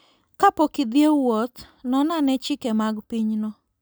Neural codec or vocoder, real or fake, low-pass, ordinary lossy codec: none; real; none; none